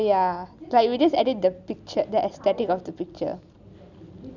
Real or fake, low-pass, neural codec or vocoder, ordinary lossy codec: real; 7.2 kHz; none; none